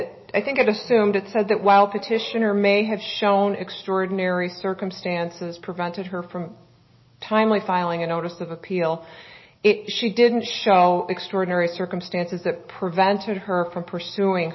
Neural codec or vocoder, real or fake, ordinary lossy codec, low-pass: none; real; MP3, 24 kbps; 7.2 kHz